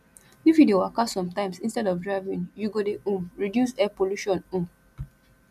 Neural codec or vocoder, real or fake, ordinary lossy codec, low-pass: none; real; none; 14.4 kHz